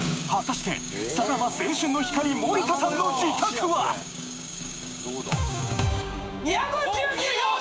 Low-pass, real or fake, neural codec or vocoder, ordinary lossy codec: none; fake; codec, 16 kHz, 6 kbps, DAC; none